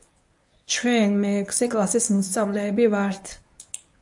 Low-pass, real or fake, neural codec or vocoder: 10.8 kHz; fake; codec, 24 kHz, 0.9 kbps, WavTokenizer, medium speech release version 1